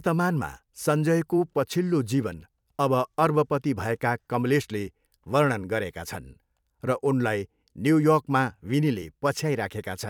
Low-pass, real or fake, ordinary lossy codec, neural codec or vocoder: 19.8 kHz; fake; none; vocoder, 44.1 kHz, 128 mel bands every 256 samples, BigVGAN v2